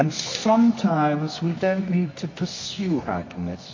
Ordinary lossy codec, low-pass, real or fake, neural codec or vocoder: MP3, 32 kbps; 7.2 kHz; fake; codec, 24 kHz, 0.9 kbps, WavTokenizer, medium music audio release